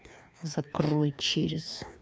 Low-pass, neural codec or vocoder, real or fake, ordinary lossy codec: none; codec, 16 kHz, 2 kbps, FreqCodec, larger model; fake; none